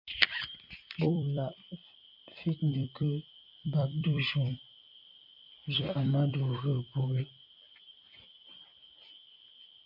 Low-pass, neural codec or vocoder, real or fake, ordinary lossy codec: 5.4 kHz; vocoder, 44.1 kHz, 128 mel bands every 256 samples, BigVGAN v2; fake; MP3, 48 kbps